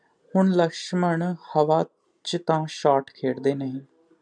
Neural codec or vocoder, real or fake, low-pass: none; real; 9.9 kHz